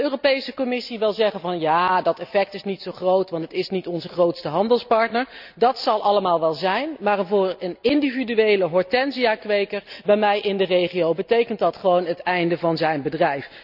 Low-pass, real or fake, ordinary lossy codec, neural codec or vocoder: 5.4 kHz; real; none; none